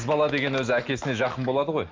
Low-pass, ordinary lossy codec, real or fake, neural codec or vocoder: 7.2 kHz; Opus, 32 kbps; real; none